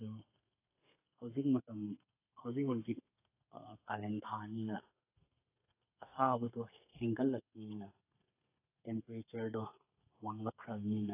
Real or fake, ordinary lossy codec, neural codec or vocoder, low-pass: fake; AAC, 24 kbps; codec, 32 kHz, 1.9 kbps, SNAC; 3.6 kHz